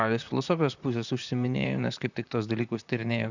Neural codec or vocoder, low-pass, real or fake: vocoder, 44.1 kHz, 128 mel bands, Pupu-Vocoder; 7.2 kHz; fake